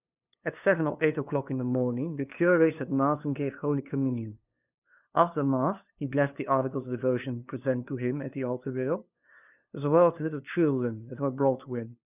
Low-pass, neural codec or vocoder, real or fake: 3.6 kHz; codec, 16 kHz, 2 kbps, FunCodec, trained on LibriTTS, 25 frames a second; fake